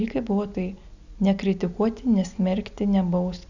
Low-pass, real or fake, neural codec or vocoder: 7.2 kHz; real; none